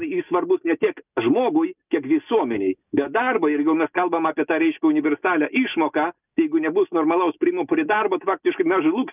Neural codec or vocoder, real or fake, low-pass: none; real; 3.6 kHz